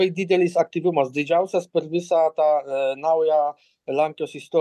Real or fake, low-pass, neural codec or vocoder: real; 14.4 kHz; none